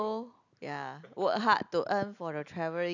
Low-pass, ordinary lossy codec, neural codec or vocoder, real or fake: 7.2 kHz; none; none; real